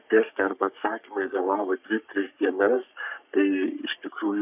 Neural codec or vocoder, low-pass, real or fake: codec, 44.1 kHz, 3.4 kbps, Pupu-Codec; 3.6 kHz; fake